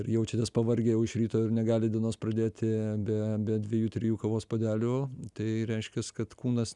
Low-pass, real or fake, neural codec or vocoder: 10.8 kHz; real; none